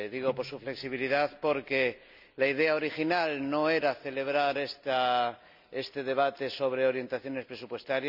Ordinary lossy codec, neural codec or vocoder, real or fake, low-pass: none; none; real; 5.4 kHz